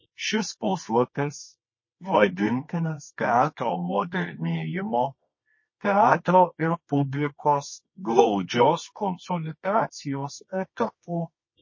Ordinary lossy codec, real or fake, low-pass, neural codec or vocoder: MP3, 32 kbps; fake; 7.2 kHz; codec, 24 kHz, 0.9 kbps, WavTokenizer, medium music audio release